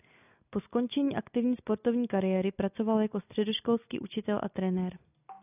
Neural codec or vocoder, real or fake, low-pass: none; real; 3.6 kHz